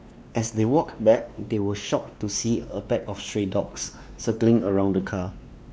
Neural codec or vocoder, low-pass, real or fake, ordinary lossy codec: codec, 16 kHz, 2 kbps, X-Codec, WavLM features, trained on Multilingual LibriSpeech; none; fake; none